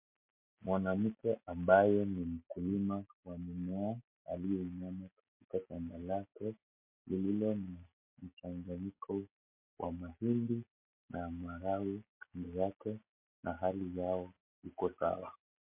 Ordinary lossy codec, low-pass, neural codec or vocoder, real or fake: MP3, 32 kbps; 3.6 kHz; none; real